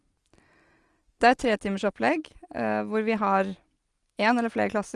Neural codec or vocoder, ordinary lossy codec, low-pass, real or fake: none; Opus, 24 kbps; 10.8 kHz; real